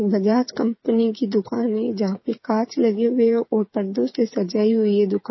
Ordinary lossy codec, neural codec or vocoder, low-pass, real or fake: MP3, 24 kbps; codec, 16 kHz, 8 kbps, FreqCodec, smaller model; 7.2 kHz; fake